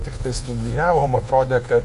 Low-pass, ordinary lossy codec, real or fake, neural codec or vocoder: 10.8 kHz; AAC, 48 kbps; fake; codec, 24 kHz, 1.2 kbps, DualCodec